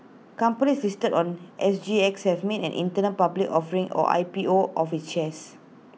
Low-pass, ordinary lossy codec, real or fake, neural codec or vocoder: none; none; real; none